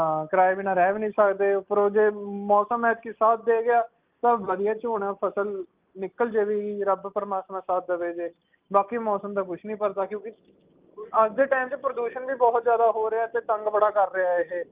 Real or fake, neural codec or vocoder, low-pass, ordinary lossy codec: real; none; 3.6 kHz; Opus, 24 kbps